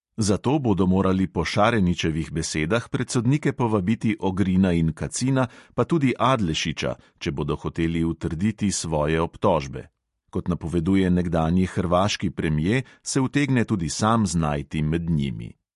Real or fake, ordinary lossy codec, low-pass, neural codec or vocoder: real; MP3, 48 kbps; 14.4 kHz; none